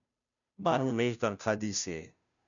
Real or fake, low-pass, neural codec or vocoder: fake; 7.2 kHz; codec, 16 kHz, 0.5 kbps, FunCodec, trained on Chinese and English, 25 frames a second